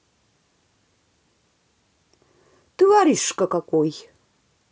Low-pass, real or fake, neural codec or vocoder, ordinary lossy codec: none; real; none; none